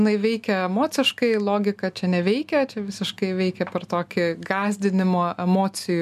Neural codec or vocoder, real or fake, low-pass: none; real; 14.4 kHz